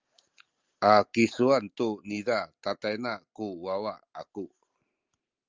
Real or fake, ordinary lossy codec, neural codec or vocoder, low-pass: real; Opus, 32 kbps; none; 7.2 kHz